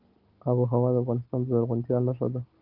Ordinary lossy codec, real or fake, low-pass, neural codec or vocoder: Opus, 16 kbps; real; 5.4 kHz; none